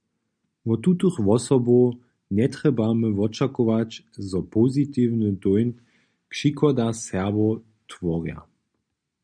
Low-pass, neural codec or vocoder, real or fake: 9.9 kHz; none; real